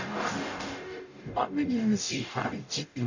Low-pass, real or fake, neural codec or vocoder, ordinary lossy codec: 7.2 kHz; fake; codec, 44.1 kHz, 0.9 kbps, DAC; none